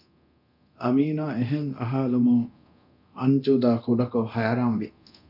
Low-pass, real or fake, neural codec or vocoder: 5.4 kHz; fake; codec, 24 kHz, 0.9 kbps, DualCodec